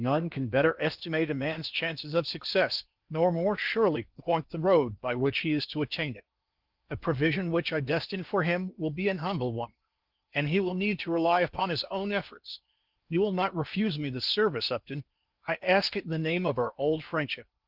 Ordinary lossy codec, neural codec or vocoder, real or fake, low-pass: Opus, 32 kbps; codec, 16 kHz in and 24 kHz out, 0.8 kbps, FocalCodec, streaming, 65536 codes; fake; 5.4 kHz